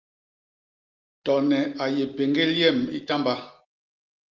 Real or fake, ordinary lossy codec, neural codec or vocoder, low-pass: real; Opus, 32 kbps; none; 7.2 kHz